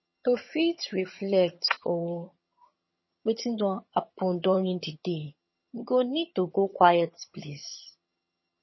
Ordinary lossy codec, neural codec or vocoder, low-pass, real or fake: MP3, 24 kbps; vocoder, 22.05 kHz, 80 mel bands, HiFi-GAN; 7.2 kHz; fake